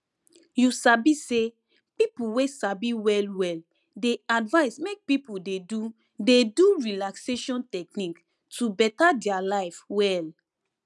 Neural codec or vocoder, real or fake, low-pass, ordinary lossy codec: none; real; none; none